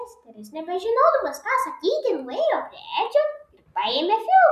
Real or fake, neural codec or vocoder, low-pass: real; none; 14.4 kHz